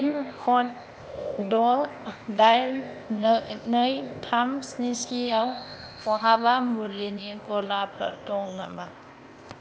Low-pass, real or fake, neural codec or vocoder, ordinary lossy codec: none; fake; codec, 16 kHz, 0.8 kbps, ZipCodec; none